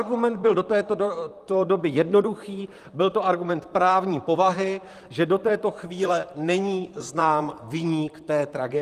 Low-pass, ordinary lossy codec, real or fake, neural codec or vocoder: 14.4 kHz; Opus, 32 kbps; fake; vocoder, 44.1 kHz, 128 mel bands, Pupu-Vocoder